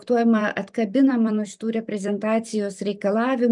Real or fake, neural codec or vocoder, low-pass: fake; vocoder, 44.1 kHz, 128 mel bands every 256 samples, BigVGAN v2; 10.8 kHz